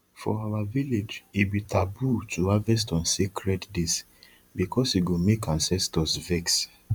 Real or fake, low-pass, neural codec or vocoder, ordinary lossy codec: fake; 19.8 kHz; vocoder, 44.1 kHz, 128 mel bands every 512 samples, BigVGAN v2; none